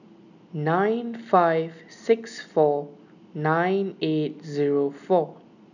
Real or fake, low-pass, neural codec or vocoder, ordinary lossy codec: real; 7.2 kHz; none; none